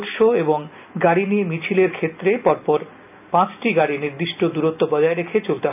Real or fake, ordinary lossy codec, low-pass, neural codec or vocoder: real; none; 3.6 kHz; none